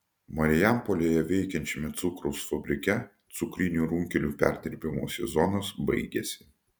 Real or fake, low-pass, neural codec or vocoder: real; 19.8 kHz; none